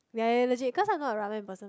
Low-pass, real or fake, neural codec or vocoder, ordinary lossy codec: none; real; none; none